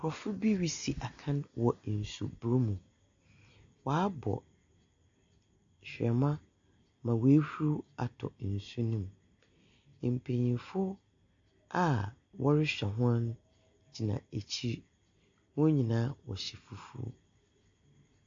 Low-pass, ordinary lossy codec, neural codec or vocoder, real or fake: 7.2 kHz; AAC, 48 kbps; none; real